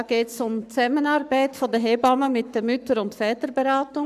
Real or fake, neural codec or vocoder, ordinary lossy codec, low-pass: fake; codec, 44.1 kHz, 7.8 kbps, Pupu-Codec; none; 14.4 kHz